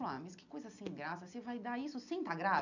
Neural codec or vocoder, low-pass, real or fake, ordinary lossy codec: none; 7.2 kHz; real; none